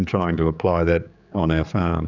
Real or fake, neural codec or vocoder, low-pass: fake; codec, 16 kHz, 4 kbps, X-Codec, HuBERT features, trained on general audio; 7.2 kHz